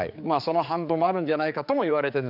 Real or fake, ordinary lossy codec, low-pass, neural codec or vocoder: fake; none; 5.4 kHz; codec, 16 kHz, 4 kbps, X-Codec, HuBERT features, trained on general audio